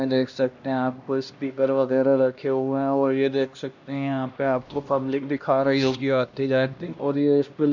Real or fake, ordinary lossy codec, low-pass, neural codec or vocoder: fake; none; 7.2 kHz; codec, 16 kHz, 1 kbps, X-Codec, HuBERT features, trained on LibriSpeech